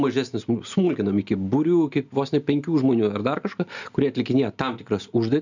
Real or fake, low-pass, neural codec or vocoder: real; 7.2 kHz; none